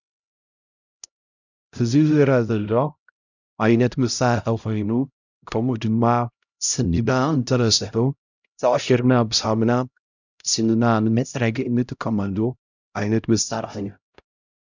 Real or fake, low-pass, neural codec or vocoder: fake; 7.2 kHz; codec, 16 kHz, 0.5 kbps, X-Codec, HuBERT features, trained on LibriSpeech